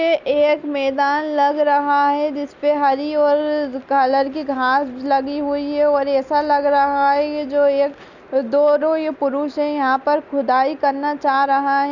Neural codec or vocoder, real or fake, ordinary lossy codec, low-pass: none; real; Opus, 64 kbps; 7.2 kHz